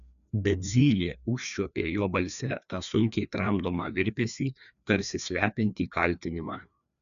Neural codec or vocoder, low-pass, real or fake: codec, 16 kHz, 2 kbps, FreqCodec, larger model; 7.2 kHz; fake